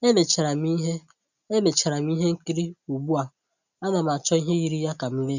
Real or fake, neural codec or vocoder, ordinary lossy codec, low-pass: real; none; none; 7.2 kHz